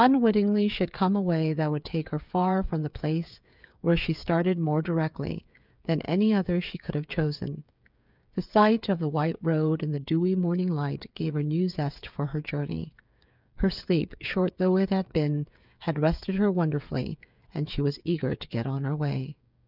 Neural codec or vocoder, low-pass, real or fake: codec, 16 kHz, 8 kbps, FreqCodec, smaller model; 5.4 kHz; fake